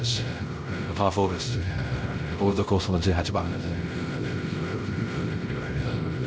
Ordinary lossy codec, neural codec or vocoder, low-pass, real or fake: none; codec, 16 kHz, 0.5 kbps, X-Codec, WavLM features, trained on Multilingual LibriSpeech; none; fake